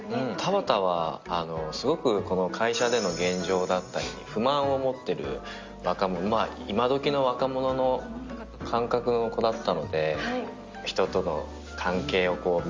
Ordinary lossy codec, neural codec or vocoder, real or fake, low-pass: Opus, 32 kbps; none; real; 7.2 kHz